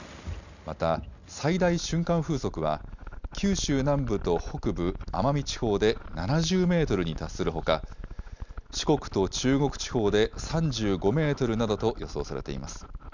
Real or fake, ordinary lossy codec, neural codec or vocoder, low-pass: real; none; none; 7.2 kHz